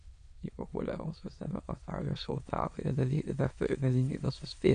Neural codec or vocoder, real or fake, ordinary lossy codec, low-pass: autoencoder, 22.05 kHz, a latent of 192 numbers a frame, VITS, trained on many speakers; fake; MP3, 64 kbps; 9.9 kHz